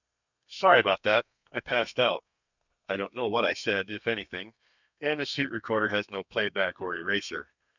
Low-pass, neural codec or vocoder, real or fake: 7.2 kHz; codec, 32 kHz, 1.9 kbps, SNAC; fake